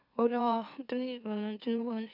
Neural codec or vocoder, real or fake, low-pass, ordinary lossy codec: autoencoder, 44.1 kHz, a latent of 192 numbers a frame, MeloTTS; fake; 5.4 kHz; none